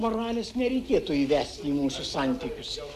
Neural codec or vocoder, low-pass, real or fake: none; 14.4 kHz; real